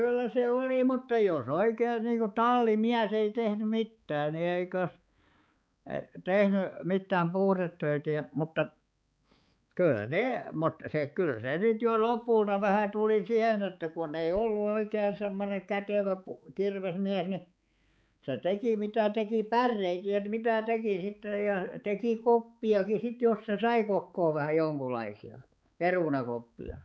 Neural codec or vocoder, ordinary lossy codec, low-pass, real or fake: codec, 16 kHz, 4 kbps, X-Codec, HuBERT features, trained on balanced general audio; none; none; fake